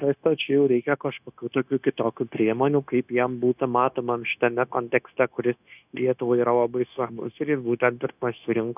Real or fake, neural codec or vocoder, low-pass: fake; codec, 16 kHz, 0.9 kbps, LongCat-Audio-Codec; 3.6 kHz